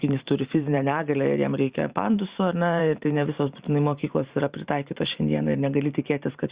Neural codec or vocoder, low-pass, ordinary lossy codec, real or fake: vocoder, 44.1 kHz, 128 mel bands every 512 samples, BigVGAN v2; 3.6 kHz; Opus, 64 kbps; fake